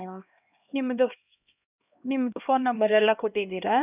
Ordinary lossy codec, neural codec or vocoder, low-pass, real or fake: none; codec, 16 kHz, 1 kbps, X-Codec, HuBERT features, trained on LibriSpeech; 3.6 kHz; fake